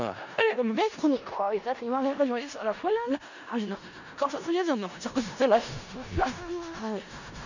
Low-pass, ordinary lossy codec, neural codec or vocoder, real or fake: 7.2 kHz; none; codec, 16 kHz in and 24 kHz out, 0.4 kbps, LongCat-Audio-Codec, four codebook decoder; fake